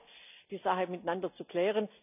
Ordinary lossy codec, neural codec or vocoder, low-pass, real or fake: none; none; 3.6 kHz; real